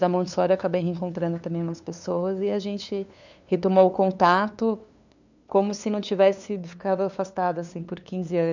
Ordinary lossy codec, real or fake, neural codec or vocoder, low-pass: none; fake; codec, 16 kHz, 2 kbps, FunCodec, trained on LibriTTS, 25 frames a second; 7.2 kHz